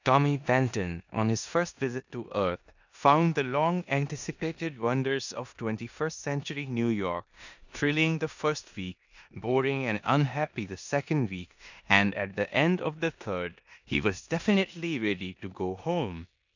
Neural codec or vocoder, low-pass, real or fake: codec, 16 kHz in and 24 kHz out, 0.9 kbps, LongCat-Audio-Codec, four codebook decoder; 7.2 kHz; fake